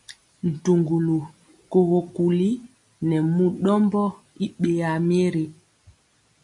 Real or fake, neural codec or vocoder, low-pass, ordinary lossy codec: real; none; 10.8 kHz; MP3, 96 kbps